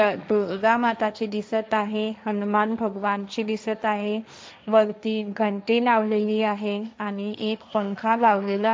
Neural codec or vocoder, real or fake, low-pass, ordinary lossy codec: codec, 16 kHz, 1.1 kbps, Voila-Tokenizer; fake; none; none